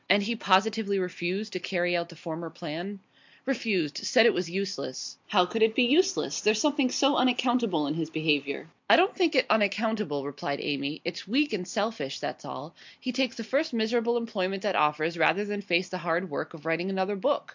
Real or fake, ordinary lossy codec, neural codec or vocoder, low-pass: real; MP3, 64 kbps; none; 7.2 kHz